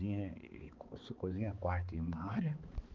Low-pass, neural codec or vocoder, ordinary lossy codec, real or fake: 7.2 kHz; codec, 16 kHz, 4 kbps, X-Codec, HuBERT features, trained on balanced general audio; Opus, 32 kbps; fake